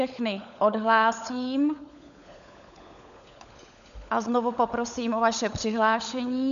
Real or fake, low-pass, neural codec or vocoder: fake; 7.2 kHz; codec, 16 kHz, 16 kbps, FunCodec, trained on LibriTTS, 50 frames a second